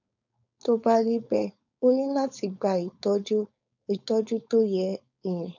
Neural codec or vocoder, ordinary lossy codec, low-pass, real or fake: codec, 16 kHz, 4.8 kbps, FACodec; none; 7.2 kHz; fake